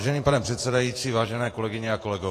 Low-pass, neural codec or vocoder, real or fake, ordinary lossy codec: 14.4 kHz; vocoder, 48 kHz, 128 mel bands, Vocos; fake; AAC, 48 kbps